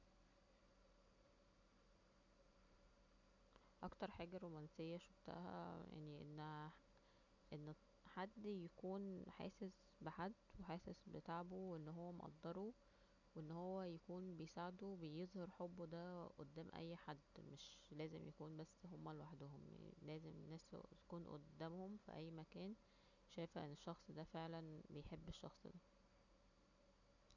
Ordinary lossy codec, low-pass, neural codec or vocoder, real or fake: none; 7.2 kHz; none; real